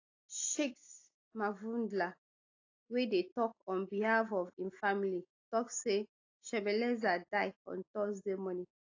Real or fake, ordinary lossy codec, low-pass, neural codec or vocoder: real; AAC, 32 kbps; 7.2 kHz; none